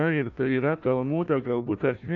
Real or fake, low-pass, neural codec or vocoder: fake; 7.2 kHz; codec, 16 kHz, 1 kbps, FunCodec, trained on Chinese and English, 50 frames a second